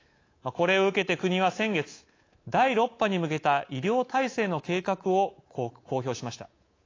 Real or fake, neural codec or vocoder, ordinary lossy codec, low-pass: fake; codec, 24 kHz, 3.1 kbps, DualCodec; AAC, 32 kbps; 7.2 kHz